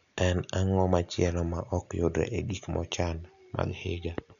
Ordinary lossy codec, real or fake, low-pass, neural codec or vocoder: none; real; 7.2 kHz; none